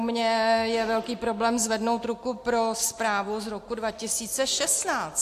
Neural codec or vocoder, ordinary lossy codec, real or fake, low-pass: none; AAC, 64 kbps; real; 14.4 kHz